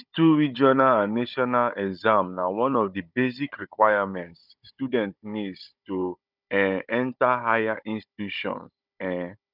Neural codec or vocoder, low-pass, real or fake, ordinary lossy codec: codec, 16 kHz, 16 kbps, FunCodec, trained on Chinese and English, 50 frames a second; 5.4 kHz; fake; none